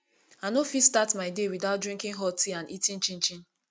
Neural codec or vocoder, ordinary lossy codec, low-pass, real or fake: none; none; none; real